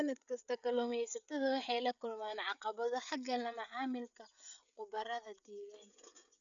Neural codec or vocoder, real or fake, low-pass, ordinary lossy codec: codec, 16 kHz, 16 kbps, FreqCodec, smaller model; fake; 7.2 kHz; none